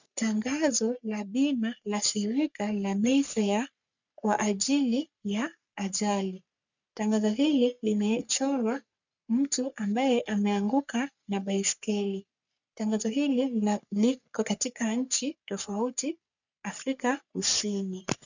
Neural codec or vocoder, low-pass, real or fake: codec, 44.1 kHz, 3.4 kbps, Pupu-Codec; 7.2 kHz; fake